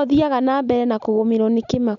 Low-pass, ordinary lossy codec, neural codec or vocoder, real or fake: 7.2 kHz; none; none; real